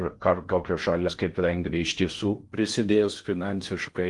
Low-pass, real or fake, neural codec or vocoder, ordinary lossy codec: 10.8 kHz; fake; codec, 16 kHz in and 24 kHz out, 0.6 kbps, FocalCodec, streaming, 4096 codes; Opus, 24 kbps